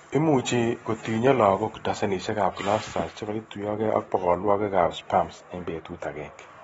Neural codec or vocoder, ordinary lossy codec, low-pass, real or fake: none; AAC, 24 kbps; 9.9 kHz; real